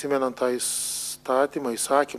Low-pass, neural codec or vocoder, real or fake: 14.4 kHz; none; real